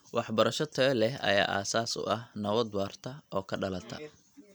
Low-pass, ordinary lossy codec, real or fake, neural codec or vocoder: none; none; real; none